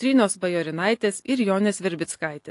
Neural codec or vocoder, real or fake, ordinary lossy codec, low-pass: none; real; AAC, 48 kbps; 10.8 kHz